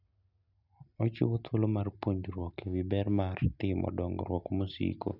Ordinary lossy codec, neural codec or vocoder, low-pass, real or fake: none; none; 5.4 kHz; real